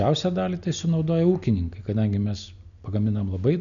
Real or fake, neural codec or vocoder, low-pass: real; none; 7.2 kHz